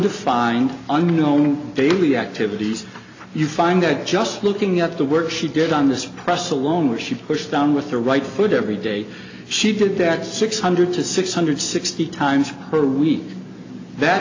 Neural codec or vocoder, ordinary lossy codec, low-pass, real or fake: none; AAC, 48 kbps; 7.2 kHz; real